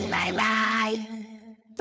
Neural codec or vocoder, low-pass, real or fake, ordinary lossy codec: codec, 16 kHz, 4.8 kbps, FACodec; none; fake; none